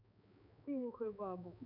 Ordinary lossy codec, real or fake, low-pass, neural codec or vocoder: none; fake; 5.4 kHz; codec, 16 kHz, 4 kbps, X-Codec, HuBERT features, trained on balanced general audio